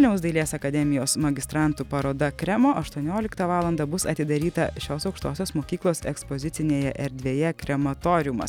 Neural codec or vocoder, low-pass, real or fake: none; 19.8 kHz; real